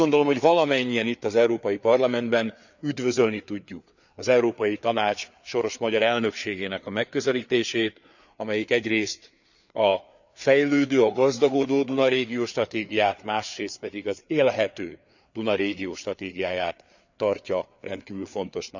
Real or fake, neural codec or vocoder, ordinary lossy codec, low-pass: fake; codec, 16 kHz, 4 kbps, FreqCodec, larger model; none; 7.2 kHz